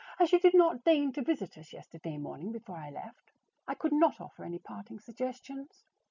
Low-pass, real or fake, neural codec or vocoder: 7.2 kHz; real; none